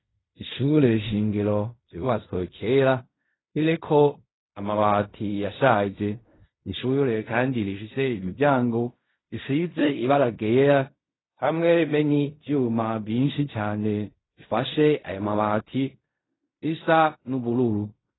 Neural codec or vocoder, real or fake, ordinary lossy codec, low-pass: codec, 16 kHz in and 24 kHz out, 0.4 kbps, LongCat-Audio-Codec, fine tuned four codebook decoder; fake; AAC, 16 kbps; 7.2 kHz